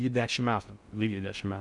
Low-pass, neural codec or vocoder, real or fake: 10.8 kHz; codec, 16 kHz in and 24 kHz out, 0.6 kbps, FocalCodec, streaming, 4096 codes; fake